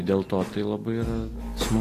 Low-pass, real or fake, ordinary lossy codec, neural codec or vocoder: 14.4 kHz; real; AAC, 48 kbps; none